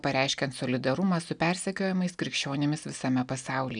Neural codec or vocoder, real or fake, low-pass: none; real; 9.9 kHz